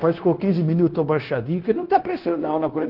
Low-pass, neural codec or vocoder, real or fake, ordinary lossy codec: 5.4 kHz; codec, 16 kHz, 0.9 kbps, LongCat-Audio-Codec; fake; Opus, 16 kbps